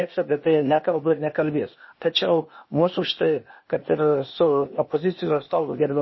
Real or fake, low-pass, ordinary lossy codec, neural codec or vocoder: fake; 7.2 kHz; MP3, 24 kbps; codec, 16 kHz, 0.8 kbps, ZipCodec